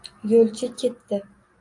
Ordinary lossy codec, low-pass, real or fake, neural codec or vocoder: AAC, 64 kbps; 10.8 kHz; real; none